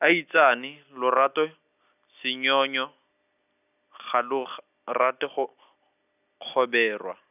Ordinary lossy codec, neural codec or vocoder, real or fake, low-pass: none; none; real; 3.6 kHz